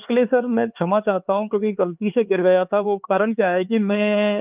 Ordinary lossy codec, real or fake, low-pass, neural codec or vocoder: Opus, 24 kbps; fake; 3.6 kHz; codec, 16 kHz, 4 kbps, X-Codec, HuBERT features, trained on LibriSpeech